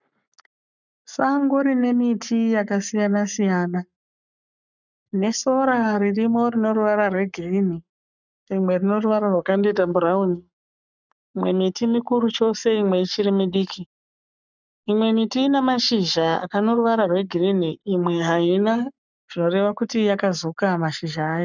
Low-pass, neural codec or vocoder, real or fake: 7.2 kHz; codec, 44.1 kHz, 7.8 kbps, Pupu-Codec; fake